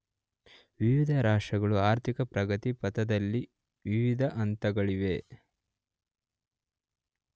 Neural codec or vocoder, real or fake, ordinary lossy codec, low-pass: none; real; none; none